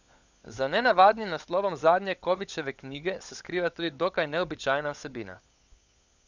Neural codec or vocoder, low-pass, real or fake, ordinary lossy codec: codec, 16 kHz, 16 kbps, FunCodec, trained on LibriTTS, 50 frames a second; 7.2 kHz; fake; none